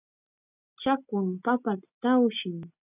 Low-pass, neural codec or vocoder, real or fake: 3.6 kHz; none; real